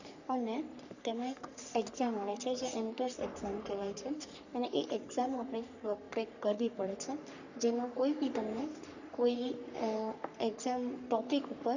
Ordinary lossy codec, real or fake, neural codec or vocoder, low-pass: none; fake; codec, 44.1 kHz, 3.4 kbps, Pupu-Codec; 7.2 kHz